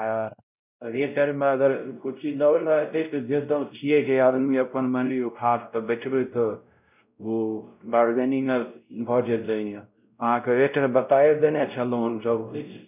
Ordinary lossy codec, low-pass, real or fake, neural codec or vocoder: none; 3.6 kHz; fake; codec, 16 kHz, 0.5 kbps, X-Codec, WavLM features, trained on Multilingual LibriSpeech